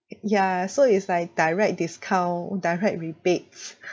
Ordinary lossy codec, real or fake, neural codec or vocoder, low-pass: none; real; none; none